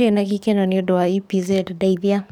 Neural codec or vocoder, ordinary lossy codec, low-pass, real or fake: codec, 44.1 kHz, 7.8 kbps, DAC; none; 19.8 kHz; fake